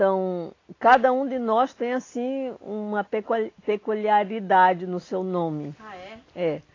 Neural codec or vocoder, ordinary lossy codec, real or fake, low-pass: none; AAC, 32 kbps; real; 7.2 kHz